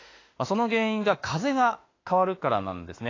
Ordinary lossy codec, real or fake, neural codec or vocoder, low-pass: AAC, 32 kbps; fake; autoencoder, 48 kHz, 32 numbers a frame, DAC-VAE, trained on Japanese speech; 7.2 kHz